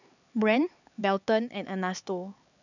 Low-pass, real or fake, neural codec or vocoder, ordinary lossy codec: 7.2 kHz; fake; codec, 16 kHz, 4 kbps, X-Codec, HuBERT features, trained on LibriSpeech; none